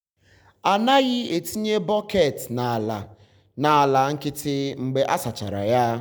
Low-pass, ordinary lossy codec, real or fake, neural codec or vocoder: none; none; real; none